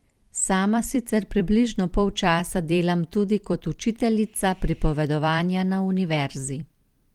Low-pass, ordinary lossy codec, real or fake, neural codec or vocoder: 19.8 kHz; Opus, 24 kbps; fake; vocoder, 44.1 kHz, 128 mel bands every 256 samples, BigVGAN v2